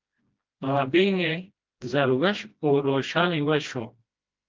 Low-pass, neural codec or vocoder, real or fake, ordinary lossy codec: 7.2 kHz; codec, 16 kHz, 1 kbps, FreqCodec, smaller model; fake; Opus, 32 kbps